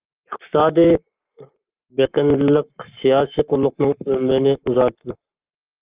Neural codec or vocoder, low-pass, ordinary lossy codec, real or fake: codec, 44.1 kHz, 7.8 kbps, Pupu-Codec; 3.6 kHz; Opus, 24 kbps; fake